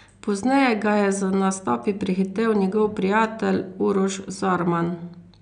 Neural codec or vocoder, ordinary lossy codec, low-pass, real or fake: none; none; 9.9 kHz; real